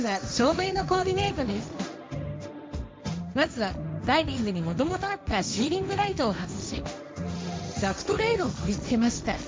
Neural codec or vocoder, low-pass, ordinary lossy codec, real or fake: codec, 16 kHz, 1.1 kbps, Voila-Tokenizer; none; none; fake